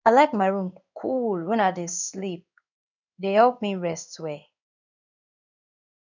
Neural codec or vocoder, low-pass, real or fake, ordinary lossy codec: codec, 16 kHz in and 24 kHz out, 1 kbps, XY-Tokenizer; 7.2 kHz; fake; none